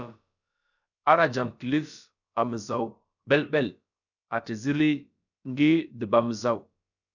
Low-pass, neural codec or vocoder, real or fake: 7.2 kHz; codec, 16 kHz, about 1 kbps, DyCAST, with the encoder's durations; fake